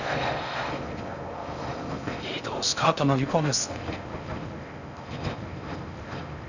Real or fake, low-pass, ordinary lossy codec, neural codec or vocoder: fake; 7.2 kHz; none; codec, 16 kHz in and 24 kHz out, 0.6 kbps, FocalCodec, streaming, 4096 codes